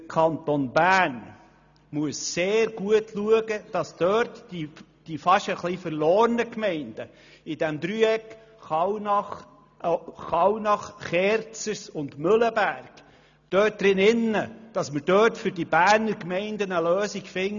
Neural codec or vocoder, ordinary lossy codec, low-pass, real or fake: none; none; 7.2 kHz; real